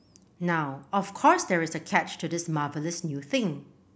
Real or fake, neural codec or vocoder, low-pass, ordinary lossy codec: real; none; none; none